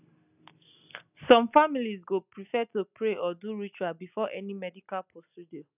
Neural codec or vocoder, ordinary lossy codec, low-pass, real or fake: none; none; 3.6 kHz; real